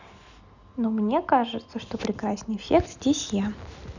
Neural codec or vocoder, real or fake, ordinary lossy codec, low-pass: none; real; none; 7.2 kHz